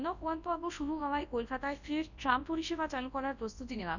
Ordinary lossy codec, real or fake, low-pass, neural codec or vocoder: none; fake; 7.2 kHz; codec, 24 kHz, 0.9 kbps, WavTokenizer, large speech release